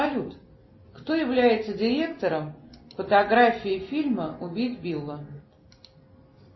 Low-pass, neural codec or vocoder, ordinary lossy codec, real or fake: 7.2 kHz; none; MP3, 24 kbps; real